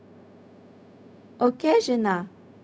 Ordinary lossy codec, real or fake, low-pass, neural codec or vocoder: none; fake; none; codec, 16 kHz, 0.4 kbps, LongCat-Audio-Codec